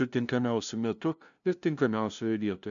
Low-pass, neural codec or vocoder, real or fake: 7.2 kHz; codec, 16 kHz, 0.5 kbps, FunCodec, trained on LibriTTS, 25 frames a second; fake